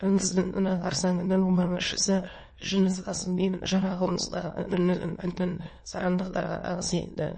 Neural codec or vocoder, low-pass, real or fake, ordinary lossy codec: autoencoder, 22.05 kHz, a latent of 192 numbers a frame, VITS, trained on many speakers; 9.9 kHz; fake; MP3, 32 kbps